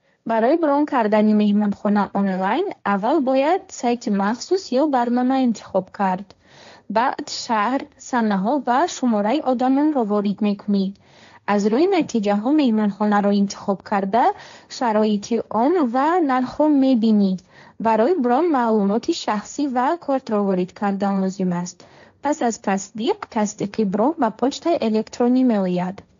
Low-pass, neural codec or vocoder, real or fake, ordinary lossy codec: 7.2 kHz; codec, 16 kHz, 1.1 kbps, Voila-Tokenizer; fake; AAC, 64 kbps